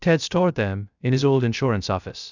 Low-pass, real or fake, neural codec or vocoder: 7.2 kHz; fake; codec, 16 kHz, 0.3 kbps, FocalCodec